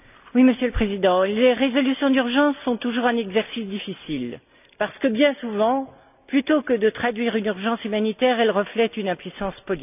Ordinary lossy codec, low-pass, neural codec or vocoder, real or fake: none; 3.6 kHz; none; real